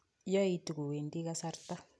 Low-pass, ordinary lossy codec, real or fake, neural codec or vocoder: none; none; real; none